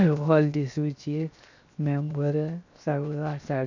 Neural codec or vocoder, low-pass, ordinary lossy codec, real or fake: codec, 16 kHz, 0.7 kbps, FocalCodec; 7.2 kHz; none; fake